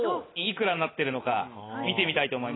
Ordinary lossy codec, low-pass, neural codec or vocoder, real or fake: AAC, 16 kbps; 7.2 kHz; none; real